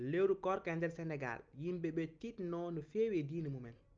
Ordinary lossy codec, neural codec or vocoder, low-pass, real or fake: Opus, 24 kbps; none; 7.2 kHz; real